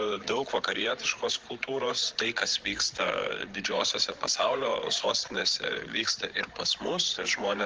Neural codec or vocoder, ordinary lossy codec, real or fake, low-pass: codec, 16 kHz, 8 kbps, FreqCodec, larger model; Opus, 16 kbps; fake; 7.2 kHz